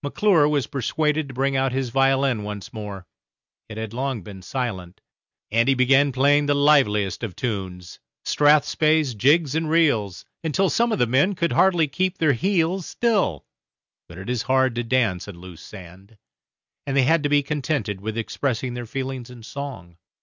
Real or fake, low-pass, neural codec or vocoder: real; 7.2 kHz; none